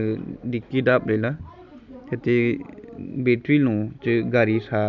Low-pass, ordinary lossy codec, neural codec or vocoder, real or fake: 7.2 kHz; none; none; real